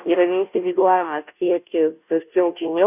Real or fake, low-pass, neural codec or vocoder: fake; 3.6 kHz; codec, 16 kHz, 0.5 kbps, FunCodec, trained on Chinese and English, 25 frames a second